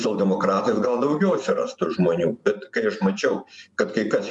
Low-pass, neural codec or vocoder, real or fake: 10.8 kHz; vocoder, 24 kHz, 100 mel bands, Vocos; fake